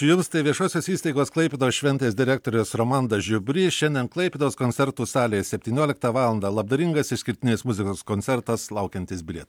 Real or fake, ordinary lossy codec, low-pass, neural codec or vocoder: real; MP3, 96 kbps; 19.8 kHz; none